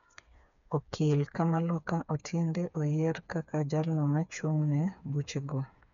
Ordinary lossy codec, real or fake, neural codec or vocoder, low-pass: none; fake; codec, 16 kHz, 4 kbps, FreqCodec, smaller model; 7.2 kHz